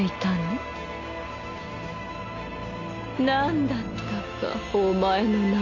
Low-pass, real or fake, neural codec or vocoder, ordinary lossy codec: 7.2 kHz; real; none; none